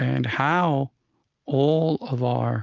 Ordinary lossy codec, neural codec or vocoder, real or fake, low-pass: Opus, 24 kbps; none; real; 7.2 kHz